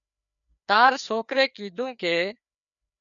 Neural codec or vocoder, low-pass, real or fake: codec, 16 kHz, 2 kbps, FreqCodec, larger model; 7.2 kHz; fake